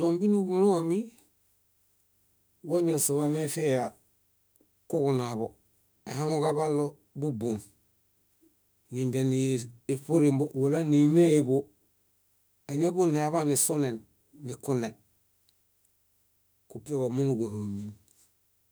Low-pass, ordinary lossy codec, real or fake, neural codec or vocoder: none; none; fake; autoencoder, 48 kHz, 32 numbers a frame, DAC-VAE, trained on Japanese speech